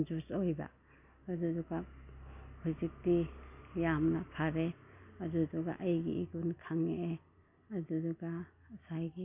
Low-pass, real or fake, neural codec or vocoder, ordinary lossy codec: 3.6 kHz; real; none; none